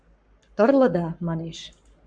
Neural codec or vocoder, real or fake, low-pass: codec, 44.1 kHz, 7.8 kbps, Pupu-Codec; fake; 9.9 kHz